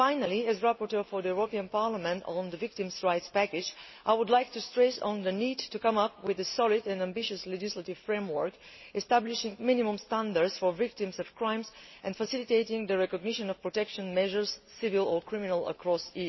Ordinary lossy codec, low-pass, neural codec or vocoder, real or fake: MP3, 24 kbps; 7.2 kHz; none; real